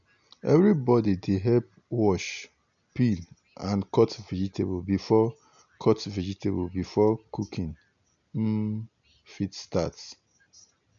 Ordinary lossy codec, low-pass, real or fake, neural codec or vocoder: none; 7.2 kHz; real; none